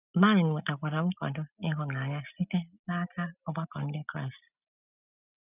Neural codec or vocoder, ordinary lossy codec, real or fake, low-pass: none; none; real; 3.6 kHz